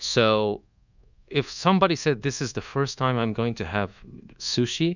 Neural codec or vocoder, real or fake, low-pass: codec, 24 kHz, 1.2 kbps, DualCodec; fake; 7.2 kHz